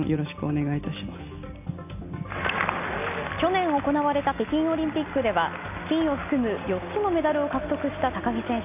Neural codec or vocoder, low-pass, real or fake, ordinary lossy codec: none; 3.6 kHz; real; none